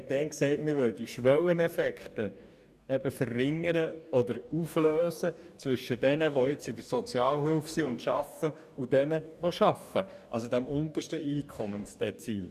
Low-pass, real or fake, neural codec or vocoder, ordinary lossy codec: 14.4 kHz; fake; codec, 44.1 kHz, 2.6 kbps, DAC; none